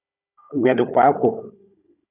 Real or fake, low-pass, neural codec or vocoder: fake; 3.6 kHz; codec, 16 kHz, 16 kbps, FunCodec, trained on Chinese and English, 50 frames a second